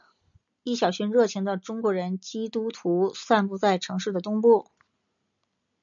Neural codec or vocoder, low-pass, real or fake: none; 7.2 kHz; real